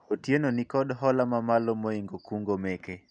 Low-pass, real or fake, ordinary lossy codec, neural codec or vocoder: 9.9 kHz; real; none; none